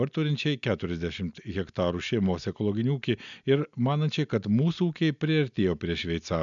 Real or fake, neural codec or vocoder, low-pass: real; none; 7.2 kHz